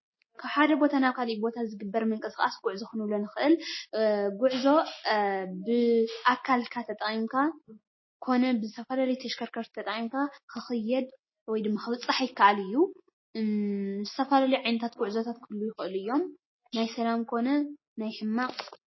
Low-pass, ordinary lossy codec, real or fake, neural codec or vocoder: 7.2 kHz; MP3, 24 kbps; real; none